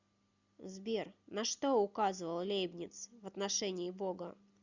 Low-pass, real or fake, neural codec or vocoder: 7.2 kHz; real; none